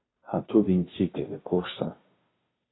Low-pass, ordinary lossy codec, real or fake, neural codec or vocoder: 7.2 kHz; AAC, 16 kbps; fake; codec, 16 kHz, 0.5 kbps, FunCodec, trained on Chinese and English, 25 frames a second